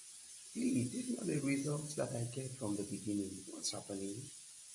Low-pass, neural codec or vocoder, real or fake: 10.8 kHz; none; real